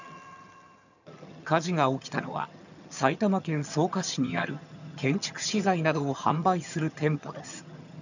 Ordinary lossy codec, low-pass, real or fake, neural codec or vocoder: none; 7.2 kHz; fake; vocoder, 22.05 kHz, 80 mel bands, HiFi-GAN